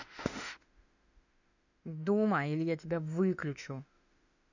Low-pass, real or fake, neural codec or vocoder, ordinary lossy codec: 7.2 kHz; fake; autoencoder, 48 kHz, 32 numbers a frame, DAC-VAE, trained on Japanese speech; none